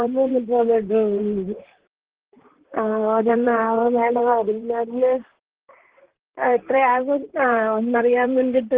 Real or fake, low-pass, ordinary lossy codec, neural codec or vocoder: fake; 3.6 kHz; Opus, 16 kbps; vocoder, 44.1 kHz, 128 mel bands, Pupu-Vocoder